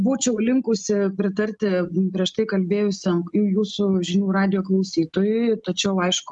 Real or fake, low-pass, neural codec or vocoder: real; 10.8 kHz; none